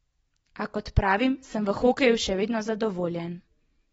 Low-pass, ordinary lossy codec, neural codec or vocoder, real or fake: 19.8 kHz; AAC, 24 kbps; none; real